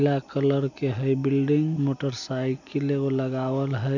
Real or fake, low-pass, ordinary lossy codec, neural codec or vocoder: real; 7.2 kHz; none; none